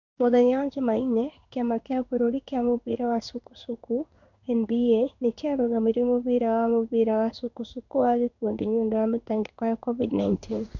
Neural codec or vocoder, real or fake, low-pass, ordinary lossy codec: codec, 16 kHz in and 24 kHz out, 1 kbps, XY-Tokenizer; fake; 7.2 kHz; none